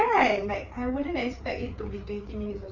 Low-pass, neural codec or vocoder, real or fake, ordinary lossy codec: 7.2 kHz; codec, 16 kHz, 4 kbps, FreqCodec, larger model; fake; Opus, 64 kbps